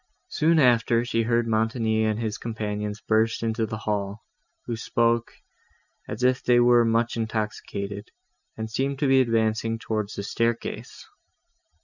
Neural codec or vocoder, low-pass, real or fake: none; 7.2 kHz; real